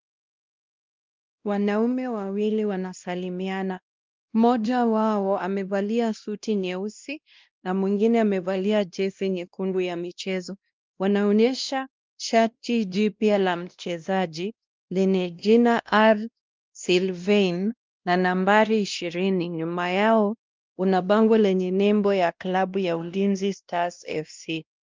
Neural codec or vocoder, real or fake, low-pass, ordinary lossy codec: codec, 16 kHz, 1 kbps, X-Codec, WavLM features, trained on Multilingual LibriSpeech; fake; 7.2 kHz; Opus, 32 kbps